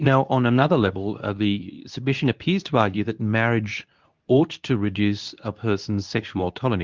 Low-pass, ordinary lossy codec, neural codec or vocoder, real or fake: 7.2 kHz; Opus, 24 kbps; codec, 24 kHz, 0.9 kbps, WavTokenizer, medium speech release version 2; fake